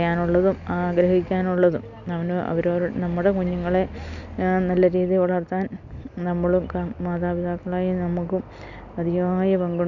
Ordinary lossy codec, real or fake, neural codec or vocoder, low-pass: none; real; none; 7.2 kHz